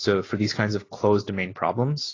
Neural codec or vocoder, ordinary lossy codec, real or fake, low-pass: none; AAC, 32 kbps; real; 7.2 kHz